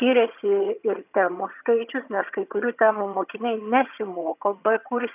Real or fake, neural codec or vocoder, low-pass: fake; vocoder, 22.05 kHz, 80 mel bands, HiFi-GAN; 3.6 kHz